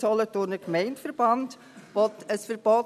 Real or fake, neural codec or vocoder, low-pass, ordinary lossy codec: real; none; 14.4 kHz; none